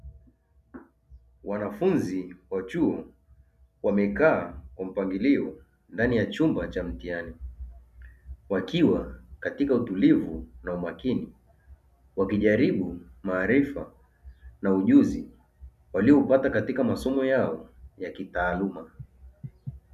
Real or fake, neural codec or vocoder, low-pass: real; none; 14.4 kHz